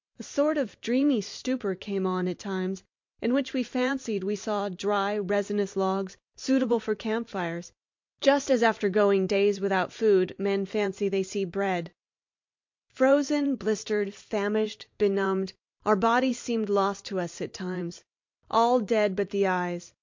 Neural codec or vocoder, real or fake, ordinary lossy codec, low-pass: vocoder, 44.1 kHz, 128 mel bands every 512 samples, BigVGAN v2; fake; MP3, 48 kbps; 7.2 kHz